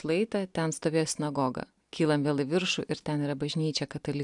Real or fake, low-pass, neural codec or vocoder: real; 10.8 kHz; none